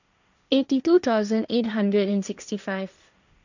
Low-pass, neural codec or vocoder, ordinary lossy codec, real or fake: 7.2 kHz; codec, 16 kHz, 1.1 kbps, Voila-Tokenizer; none; fake